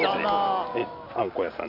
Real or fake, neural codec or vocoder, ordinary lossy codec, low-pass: real; none; AAC, 48 kbps; 5.4 kHz